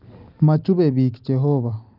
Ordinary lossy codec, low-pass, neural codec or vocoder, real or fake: Opus, 24 kbps; 5.4 kHz; none; real